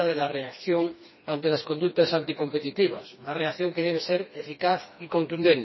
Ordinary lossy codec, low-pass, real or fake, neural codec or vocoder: MP3, 24 kbps; 7.2 kHz; fake; codec, 16 kHz, 2 kbps, FreqCodec, smaller model